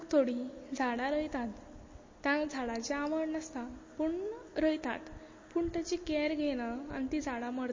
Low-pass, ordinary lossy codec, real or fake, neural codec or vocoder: 7.2 kHz; MP3, 32 kbps; real; none